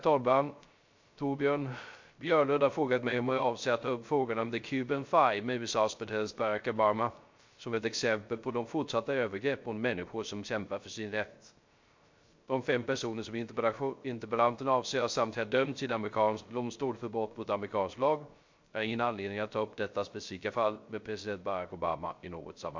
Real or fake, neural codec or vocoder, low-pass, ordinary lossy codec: fake; codec, 16 kHz, 0.3 kbps, FocalCodec; 7.2 kHz; MP3, 48 kbps